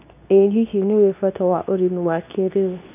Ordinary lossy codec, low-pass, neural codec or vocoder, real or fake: none; 3.6 kHz; codec, 24 kHz, 0.9 kbps, WavTokenizer, medium speech release version 2; fake